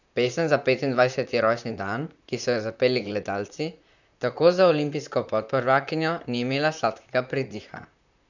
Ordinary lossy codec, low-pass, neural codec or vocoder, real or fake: none; 7.2 kHz; vocoder, 44.1 kHz, 128 mel bands, Pupu-Vocoder; fake